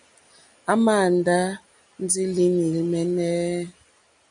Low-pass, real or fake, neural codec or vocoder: 9.9 kHz; real; none